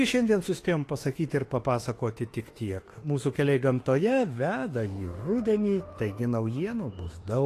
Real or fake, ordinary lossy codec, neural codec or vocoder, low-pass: fake; AAC, 48 kbps; autoencoder, 48 kHz, 32 numbers a frame, DAC-VAE, trained on Japanese speech; 14.4 kHz